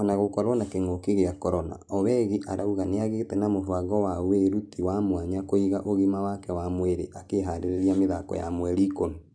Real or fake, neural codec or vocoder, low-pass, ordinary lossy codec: real; none; 9.9 kHz; none